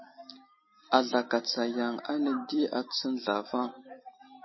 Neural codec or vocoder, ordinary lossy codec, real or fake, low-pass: none; MP3, 24 kbps; real; 7.2 kHz